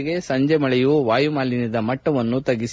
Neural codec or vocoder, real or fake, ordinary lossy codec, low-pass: none; real; none; none